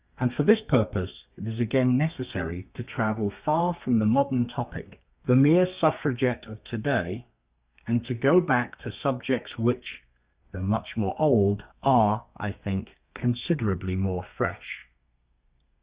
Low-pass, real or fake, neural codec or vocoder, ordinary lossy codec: 3.6 kHz; fake; codec, 32 kHz, 1.9 kbps, SNAC; Opus, 64 kbps